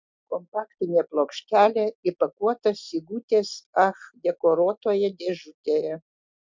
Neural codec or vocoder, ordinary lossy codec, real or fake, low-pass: none; MP3, 64 kbps; real; 7.2 kHz